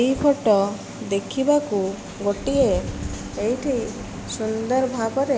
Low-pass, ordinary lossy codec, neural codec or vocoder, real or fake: none; none; none; real